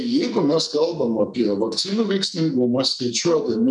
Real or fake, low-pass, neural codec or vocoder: fake; 10.8 kHz; codec, 44.1 kHz, 2.6 kbps, SNAC